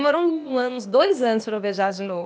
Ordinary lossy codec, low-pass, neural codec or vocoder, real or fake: none; none; codec, 16 kHz, 0.8 kbps, ZipCodec; fake